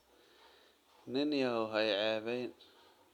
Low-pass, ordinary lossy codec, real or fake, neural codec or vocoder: 19.8 kHz; none; real; none